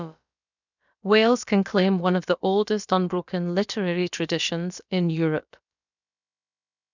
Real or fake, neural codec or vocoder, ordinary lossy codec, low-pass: fake; codec, 16 kHz, about 1 kbps, DyCAST, with the encoder's durations; none; 7.2 kHz